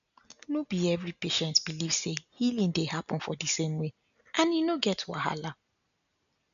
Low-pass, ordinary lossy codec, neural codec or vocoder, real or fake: 7.2 kHz; none; none; real